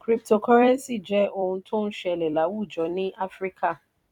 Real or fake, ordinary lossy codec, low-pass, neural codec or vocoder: fake; none; 19.8 kHz; vocoder, 44.1 kHz, 128 mel bands every 256 samples, BigVGAN v2